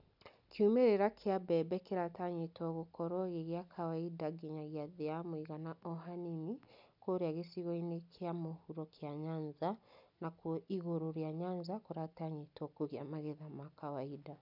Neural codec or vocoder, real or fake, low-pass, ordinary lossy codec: none; real; 5.4 kHz; none